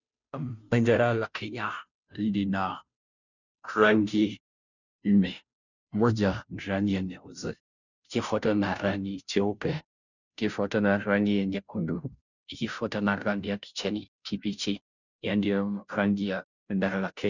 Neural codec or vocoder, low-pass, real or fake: codec, 16 kHz, 0.5 kbps, FunCodec, trained on Chinese and English, 25 frames a second; 7.2 kHz; fake